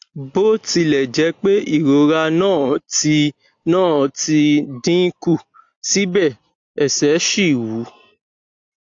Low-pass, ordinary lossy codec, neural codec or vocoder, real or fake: 7.2 kHz; AAC, 48 kbps; none; real